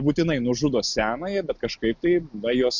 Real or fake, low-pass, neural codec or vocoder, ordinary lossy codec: real; 7.2 kHz; none; Opus, 64 kbps